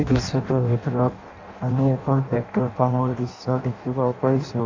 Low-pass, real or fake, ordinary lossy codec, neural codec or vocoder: 7.2 kHz; fake; MP3, 64 kbps; codec, 16 kHz in and 24 kHz out, 0.6 kbps, FireRedTTS-2 codec